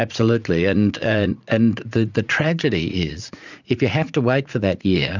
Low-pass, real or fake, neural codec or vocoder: 7.2 kHz; fake; vocoder, 44.1 kHz, 80 mel bands, Vocos